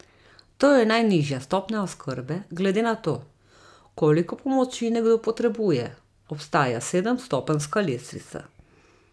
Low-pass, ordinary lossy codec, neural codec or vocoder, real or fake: none; none; none; real